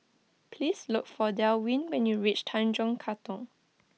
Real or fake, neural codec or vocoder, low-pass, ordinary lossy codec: real; none; none; none